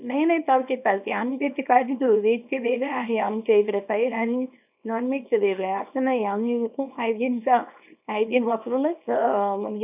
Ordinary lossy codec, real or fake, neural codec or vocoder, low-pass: none; fake; codec, 24 kHz, 0.9 kbps, WavTokenizer, small release; 3.6 kHz